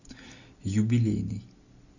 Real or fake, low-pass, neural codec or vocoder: real; 7.2 kHz; none